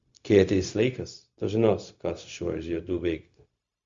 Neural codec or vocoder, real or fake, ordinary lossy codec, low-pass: codec, 16 kHz, 0.4 kbps, LongCat-Audio-Codec; fake; Opus, 64 kbps; 7.2 kHz